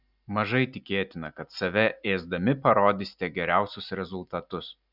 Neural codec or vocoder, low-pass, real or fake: none; 5.4 kHz; real